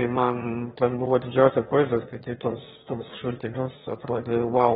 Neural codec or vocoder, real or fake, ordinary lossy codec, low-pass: autoencoder, 22.05 kHz, a latent of 192 numbers a frame, VITS, trained on one speaker; fake; AAC, 16 kbps; 9.9 kHz